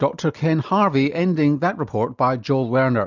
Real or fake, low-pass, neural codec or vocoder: real; 7.2 kHz; none